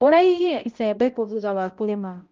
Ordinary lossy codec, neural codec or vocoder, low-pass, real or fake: Opus, 24 kbps; codec, 16 kHz, 0.5 kbps, X-Codec, HuBERT features, trained on balanced general audio; 7.2 kHz; fake